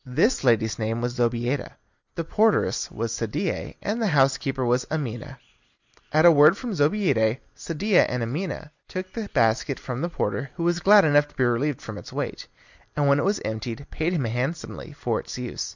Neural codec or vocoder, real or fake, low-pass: none; real; 7.2 kHz